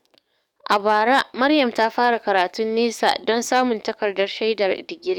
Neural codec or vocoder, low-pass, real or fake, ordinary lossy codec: codec, 44.1 kHz, 7.8 kbps, DAC; 19.8 kHz; fake; none